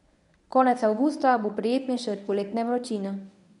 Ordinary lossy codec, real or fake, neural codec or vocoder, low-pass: none; fake; codec, 24 kHz, 0.9 kbps, WavTokenizer, medium speech release version 1; 10.8 kHz